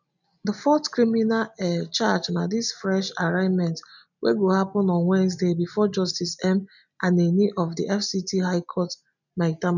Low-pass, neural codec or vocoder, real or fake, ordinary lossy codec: 7.2 kHz; none; real; none